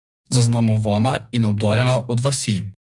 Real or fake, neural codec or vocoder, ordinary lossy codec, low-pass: fake; codec, 44.1 kHz, 2.6 kbps, DAC; none; 10.8 kHz